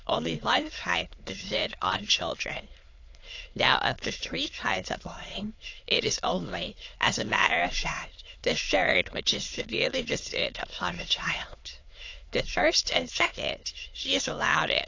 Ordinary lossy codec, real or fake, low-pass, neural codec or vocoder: AAC, 48 kbps; fake; 7.2 kHz; autoencoder, 22.05 kHz, a latent of 192 numbers a frame, VITS, trained on many speakers